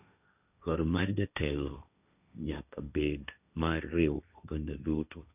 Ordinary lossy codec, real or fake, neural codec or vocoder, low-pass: none; fake; codec, 16 kHz, 1.1 kbps, Voila-Tokenizer; 3.6 kHz